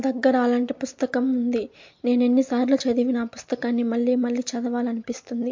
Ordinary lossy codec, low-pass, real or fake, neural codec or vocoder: MP3, 48 kbps; 7.2 kHz; real; none